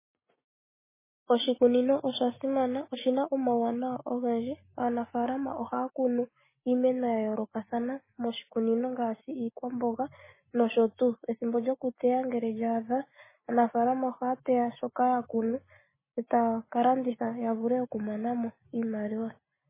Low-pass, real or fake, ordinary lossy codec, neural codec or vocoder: 3.6 kHz; real; MP3, 16 kbps; none